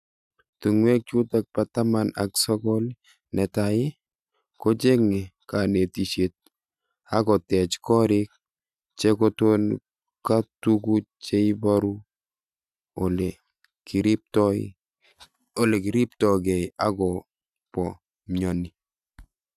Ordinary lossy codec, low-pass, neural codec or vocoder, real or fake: none; 14.4 kHz; none; real